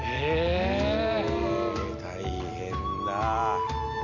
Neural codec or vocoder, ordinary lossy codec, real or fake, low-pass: none; none; real; 7.2 kHz